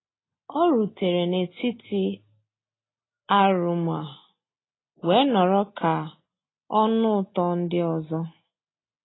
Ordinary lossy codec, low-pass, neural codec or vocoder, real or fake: AAC, 16 kbps; 7.2 kHz; none; real